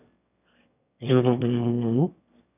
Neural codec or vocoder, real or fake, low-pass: autoencoder, 22.05 kHz, a latent of 192 numbers a frame, VITS, trained on one speaker; fake; 3.6 kHz